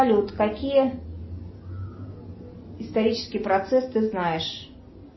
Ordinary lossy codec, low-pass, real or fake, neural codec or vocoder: MP3, 24 kbps; 7.2 kHz; real; none